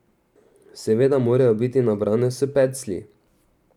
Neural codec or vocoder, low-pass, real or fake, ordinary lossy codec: vocoder, 44.1 kHz, 128 mel bands every 512 samples, BigVGAN v2; 19.8 kHz; fake; none